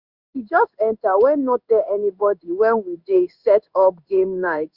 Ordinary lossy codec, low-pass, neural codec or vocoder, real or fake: Opus, 64 kbps; 5.4 kHz; codec, 16 kHz in and 24 kHz out, 1 kbps, XY-Tokenizer; fake